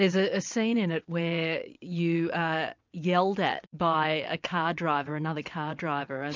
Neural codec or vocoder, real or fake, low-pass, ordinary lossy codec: vocoder, 22.05 kHz, 80 mel bands, WaveNeXt; fake; 7.2 kHz; MP3, 64 kbps